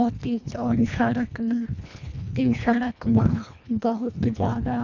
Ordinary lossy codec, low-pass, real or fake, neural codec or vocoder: none; 7.2 kHz; fake; codec, 24 kHz, 1.5 kbps, HILCodec